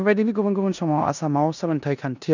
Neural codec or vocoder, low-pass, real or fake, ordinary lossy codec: codec, 16 kHz in and 24 kHz out, 0.9 kbps, LongCat-Audio-Codec, four codebook decoder; 7.2 kHz; fake; none